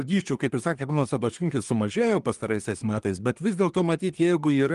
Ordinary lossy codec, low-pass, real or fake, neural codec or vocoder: Opus, 24 kbps; 10.8 kHz; fake; codec, 24 kHz, 1 kbps, SNAC